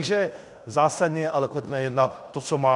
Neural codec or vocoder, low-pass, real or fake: codec, 16 kHz in and 24 kHz out, 0.9 kbps, LongCat-Audio-Codec, fine tuned four codebook decoder; 10.8 kHz; fake